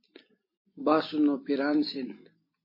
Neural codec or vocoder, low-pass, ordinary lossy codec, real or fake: none; 5.4 kHz; MP3, 24 kbps; real